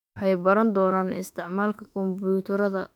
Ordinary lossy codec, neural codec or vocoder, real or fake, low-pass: none; autoencoder, 48 kHz, 32 numbers a frame, DAC-VAE, trained on Japanese speech; fake; 19.8 kHz